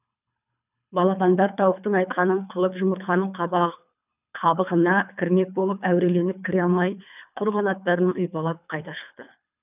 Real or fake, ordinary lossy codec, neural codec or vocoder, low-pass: fake; none; codec, 24 kHz, 3 kbps, HILCodec; 3.6 kHz